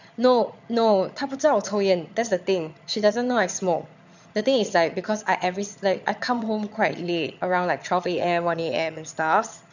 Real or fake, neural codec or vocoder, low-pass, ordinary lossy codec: fake; vocoder, 22.05 kHz, 80 mel bands, HiFi-GAN; 7.2 kHz; none